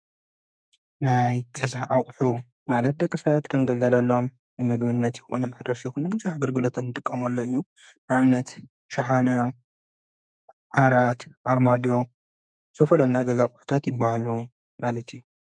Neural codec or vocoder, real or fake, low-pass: codec, 32 kHz, 1.9 kbps, SNAC; fake; 9.9 kHz